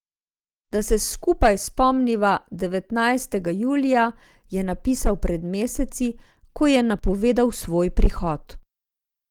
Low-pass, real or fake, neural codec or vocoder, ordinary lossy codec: 19.8 kHz; real; none; Opus, 24 kbps